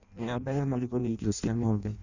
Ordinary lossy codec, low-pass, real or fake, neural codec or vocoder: none; 7.2 kHz; fake; codec, 16 kHz in and 24 kHz out, 0.6 kbps, FireRedTTS-2 codec